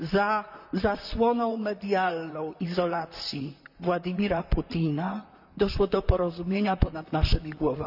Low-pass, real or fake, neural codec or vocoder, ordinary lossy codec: 5.4 kHz; fake; vocoder, 44.1 kHz, 128 mel bands, Pupu-Vocoder; none